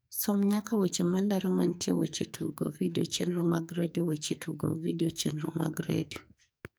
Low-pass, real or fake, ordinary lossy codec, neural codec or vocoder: none; fake; none; codec, 44.1 kHz, 2.6 kbps, SNAC